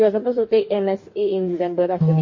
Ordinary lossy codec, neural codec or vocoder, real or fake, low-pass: MP3, 32 kbps; codec, 44.1 kHz, 2.6 kbps, DAC; fake; 7.2 kHz